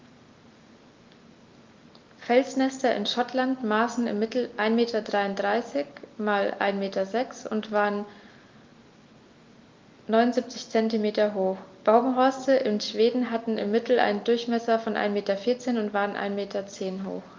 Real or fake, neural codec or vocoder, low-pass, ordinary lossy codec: real; none; 7.2 kHz; Opus, 24 kbps